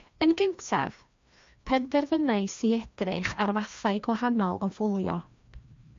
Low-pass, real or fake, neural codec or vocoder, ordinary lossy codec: 7.2 kHz; fake; codec, 16 kHz, 1 kbps, FreqCodec, larger model; MP3, 48 kbps